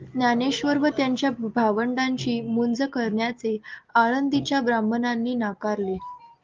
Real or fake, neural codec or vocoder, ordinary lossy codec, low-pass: real; none; Opus, 32 kbps; 7.2 kHz